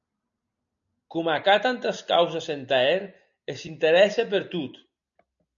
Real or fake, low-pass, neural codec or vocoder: real; 7.2 kHz; none